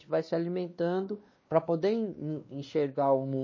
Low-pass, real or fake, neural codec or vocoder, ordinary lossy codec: 7.2 kHz; fake; codec, 16 kHz, 2 kbps, X-Codec, WavLM features, trained on Multilingual LibriSpeech; MP3, 32 kbps